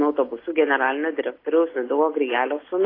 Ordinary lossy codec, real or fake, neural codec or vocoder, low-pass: AAC, 24 kbps; real; none; 5.4 kHz